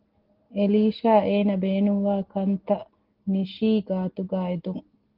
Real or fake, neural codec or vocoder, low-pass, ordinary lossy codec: real; none; 5.4 kHz; Opus, 16 kbps